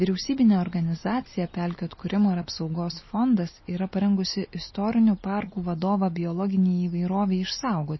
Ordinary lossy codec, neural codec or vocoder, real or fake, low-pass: MP3, 24 kbps; none; real; 7.2 kHz